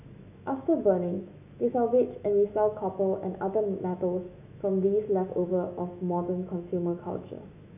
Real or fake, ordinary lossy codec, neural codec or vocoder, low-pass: fake; none; autoencoder, 48 kHz, 128 numbers a frame, DAC-VAE, trained on Japanese speech; 3.6 kHz